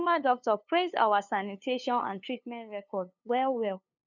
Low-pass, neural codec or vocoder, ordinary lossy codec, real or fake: 7.2 kHz; codec, 16 kHz, 2 kbps, FunCodec, trained on LibriTTS, 25 frames a second; none; fake